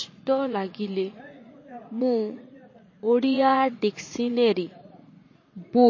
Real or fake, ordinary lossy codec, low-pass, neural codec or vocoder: fake; MP3, 32 kbps; 7.2 kHz; vocoder, 44.1 kHz, 80 mel bands, Vocos